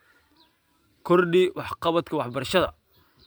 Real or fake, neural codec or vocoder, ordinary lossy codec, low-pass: fake; vocoder, 44.1 kHz, 128 mel bands every 512 samples, BigVGAN v2; none; none